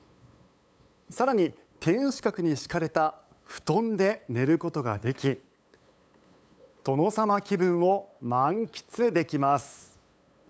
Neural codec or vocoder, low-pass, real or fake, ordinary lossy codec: codec, 16 kHz, 8 kbps, FunCodec, trained on LibriTTS, 25 frames a second; none; fake; none